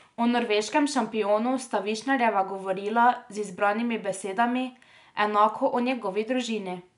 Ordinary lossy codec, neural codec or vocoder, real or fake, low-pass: none; none; real; 10.8 kHz